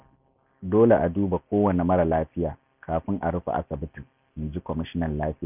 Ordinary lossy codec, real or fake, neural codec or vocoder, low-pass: none; real; none; 3.6 kHz